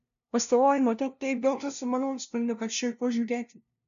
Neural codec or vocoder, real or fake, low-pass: codec, 16 kHz, 0.5 kbps, FunCodec, trained on LibriTTS, 25 frames a second; fake; 7.2 kHz